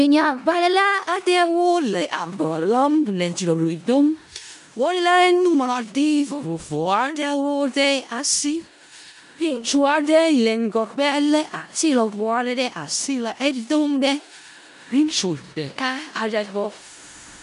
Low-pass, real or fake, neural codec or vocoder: 10.8 kHz; fake; codec, 16 kHz in and 24 kHz out, 0.4 kbps, LongCat-Audio-Codec, four codebook decoder